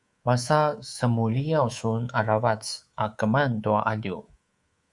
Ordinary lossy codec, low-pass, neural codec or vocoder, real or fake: Opus, 64 kbps; 10.8 kHz; codec, 24 kHz, 3.1 kbps, DualCodec; fake